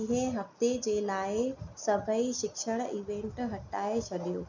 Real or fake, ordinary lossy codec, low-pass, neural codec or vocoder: real; none; 7.2 kHz; none